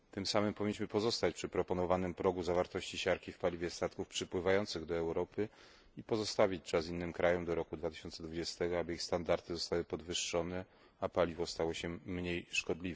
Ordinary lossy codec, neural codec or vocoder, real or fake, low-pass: none; none; real; none